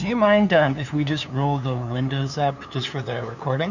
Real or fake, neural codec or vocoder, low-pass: fake; codec, 16 kHz, 2 kbps, FunCodec, trained on LibriTTS, 25 frames a second; 7.2 kHz